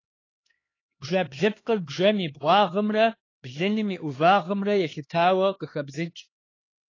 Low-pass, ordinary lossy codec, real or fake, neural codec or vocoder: 7.2 kHz; AAC, 32 kbps; fake; codec, 16 kHz, 2 kbps, X-Codec, HuBERT features, trained on LibriSpeech